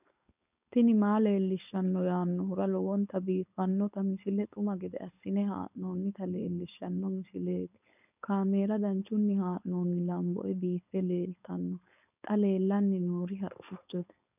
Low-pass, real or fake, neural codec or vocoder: 3.6 kHz; fake; codec, 16 kHz, 4.8 kbps, FACodec